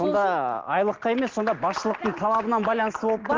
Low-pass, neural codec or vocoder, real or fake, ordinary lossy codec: 7.2 kHz; none; real; Opus, 16 kbps